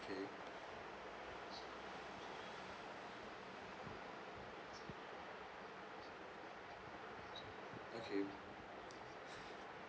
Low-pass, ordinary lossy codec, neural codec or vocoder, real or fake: none; none; none; real